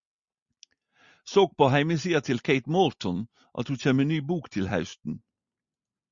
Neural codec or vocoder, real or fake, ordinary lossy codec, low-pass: none; real; Opus, 64 kbps; 7.2 kHz